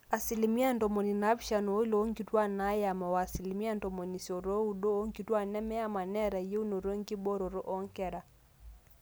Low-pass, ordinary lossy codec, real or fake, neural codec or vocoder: none; none; real; none